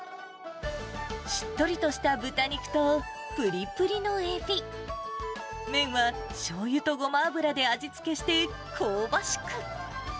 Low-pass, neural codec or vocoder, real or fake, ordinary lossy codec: none; none; real; none